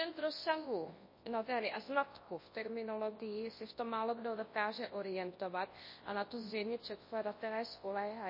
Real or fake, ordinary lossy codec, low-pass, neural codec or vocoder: fake; MP3, 24 kbps; 5.4 kHz; codec, 24 kHz, 0.9 kbps, WavTokenizer, large speech release